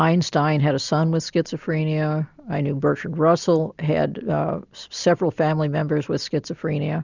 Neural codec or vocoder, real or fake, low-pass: none; real; 7.2 kHz